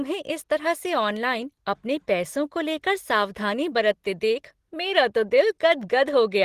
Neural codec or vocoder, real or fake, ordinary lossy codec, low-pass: none; real; Opus, 16 kbps; 14.4 kHz